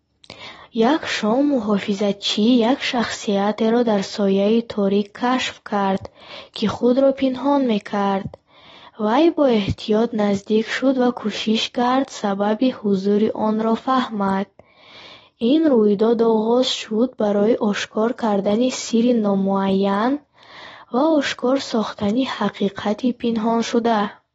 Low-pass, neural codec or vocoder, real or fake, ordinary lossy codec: 19.8 kHz; none; real; AAC, 24 kbps